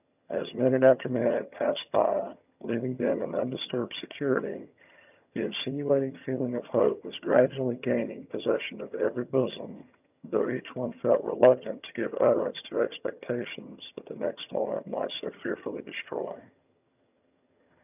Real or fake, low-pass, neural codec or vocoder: fake; 3.6 kHz; vocoder, 22.05 kHz, 80 mel bands, HiFi-GAN